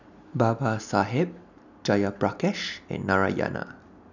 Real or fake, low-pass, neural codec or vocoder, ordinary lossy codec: real; 7.2 kHz; none; none